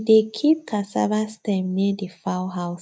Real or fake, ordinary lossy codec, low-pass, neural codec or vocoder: real; none; none; none